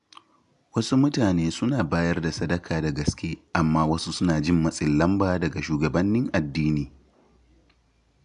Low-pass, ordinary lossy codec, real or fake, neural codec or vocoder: 10.8 kHz; none; real; none